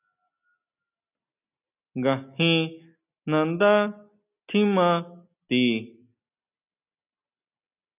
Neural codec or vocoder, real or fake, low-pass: none; real; 3.6 kHz